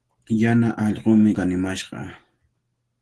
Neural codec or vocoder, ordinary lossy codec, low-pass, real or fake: none; Opus, 16 kbps; 10.8 kHz; real